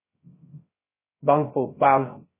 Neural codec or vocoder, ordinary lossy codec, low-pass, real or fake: codec, 16 kHz, 0.3 kbps, FocalCodec; MP3, 16 kbps; 3.6 kHz; fake